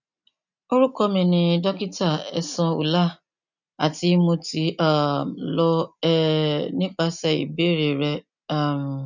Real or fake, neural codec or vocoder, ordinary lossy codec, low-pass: real; none; none; 7.2 kHz